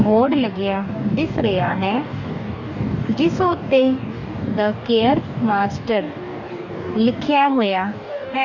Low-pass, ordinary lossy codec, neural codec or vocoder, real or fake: 7.2 kHz; none; codec, 44.1 kHz, 2.6 kbps, DAC; fake